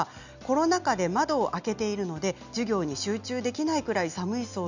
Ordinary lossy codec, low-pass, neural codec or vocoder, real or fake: none; 7.2 kHz; none; real